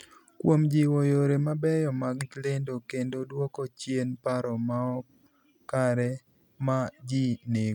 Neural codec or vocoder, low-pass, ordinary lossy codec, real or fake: none; 19.8 kHz; none; real